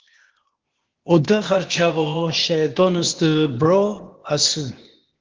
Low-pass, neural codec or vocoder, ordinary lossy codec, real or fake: 7.2 kHz; codec, 16 kHz, 0.8 kbps, ZipCodec; Opus, 16 kbps; fake